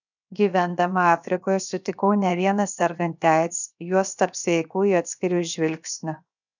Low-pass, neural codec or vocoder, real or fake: 7.2 kHz; codec, 16 kHz, 0.7 kbps, FocalCodec; fake